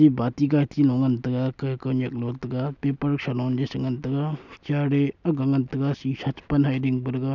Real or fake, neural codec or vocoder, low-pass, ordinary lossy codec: real; none; 7.2 kHz; none